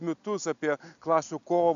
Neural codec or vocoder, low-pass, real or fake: none; 7.2 kHz; real